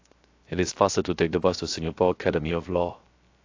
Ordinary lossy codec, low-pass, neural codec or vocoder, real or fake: AAC, 48 kbps; 7.2 kHz; codec, 16 kHz, 0.7 kbps, FocalCodec; fake